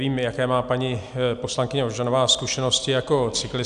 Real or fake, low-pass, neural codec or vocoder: real; 10.8 kHz; none